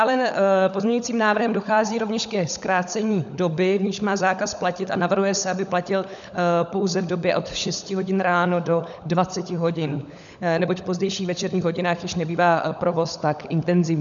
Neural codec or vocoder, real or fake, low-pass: codec, 16 kHz, 16 kbps, FunCodec, trained on LibriTTS, 50 frames a second; fake; 7.2 kHz